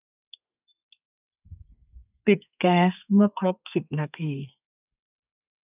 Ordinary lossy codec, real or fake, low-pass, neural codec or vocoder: none; fake; 3.6 kHz; codec, 32 kHz, 1.9 kbps, SNAC